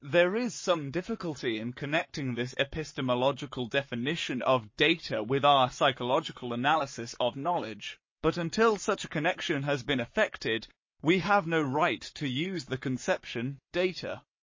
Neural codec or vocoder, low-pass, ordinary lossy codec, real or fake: codec, 44.1 kHz, 7.8 kbps, Pupu-Codec; 7.2 kHz; MP3, 32 kbps; fake